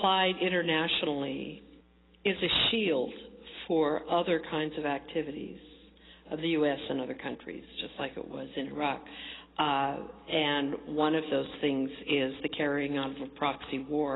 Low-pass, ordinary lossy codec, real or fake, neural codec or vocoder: 7.2 kHz; AAC, 16 kbps; real; none